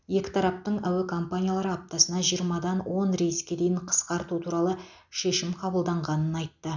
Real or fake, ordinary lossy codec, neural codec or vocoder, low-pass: real; none; none; 7.2 kHz